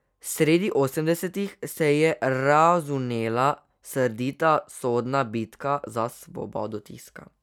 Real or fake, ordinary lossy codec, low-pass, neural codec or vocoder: real; none; 19.8 kHz; none